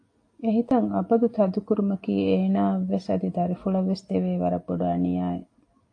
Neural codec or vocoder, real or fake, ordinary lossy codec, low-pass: none; real; AAC, 48 kbps; 9.9 kHz